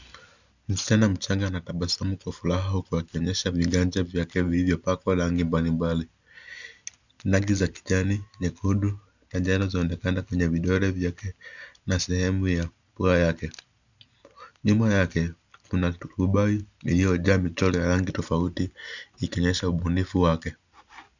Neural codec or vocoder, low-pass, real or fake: none; 7.2 kHz; real